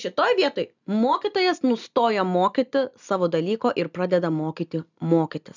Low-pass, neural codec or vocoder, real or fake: 7.2 kHz; none; real